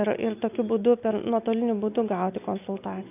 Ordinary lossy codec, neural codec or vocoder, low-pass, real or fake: AAC, 32 kbps; none; 3.6 kHz; real